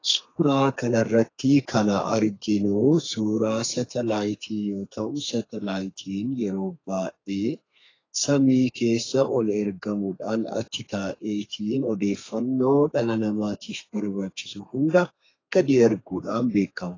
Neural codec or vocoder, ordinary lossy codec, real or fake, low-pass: codec, 32 kHz, 1.9 kbps, SNAC; AAC, 32 kbps; fake; 7.2 kHz